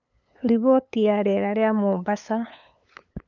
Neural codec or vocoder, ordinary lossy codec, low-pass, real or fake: codec, 16 kHz, 8 kbps, FunCodec, trained on LibriTTS, 25 frames a second; none; 7.2 kHz; fake